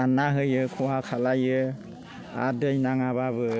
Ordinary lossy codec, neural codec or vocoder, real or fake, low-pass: none; none; real; none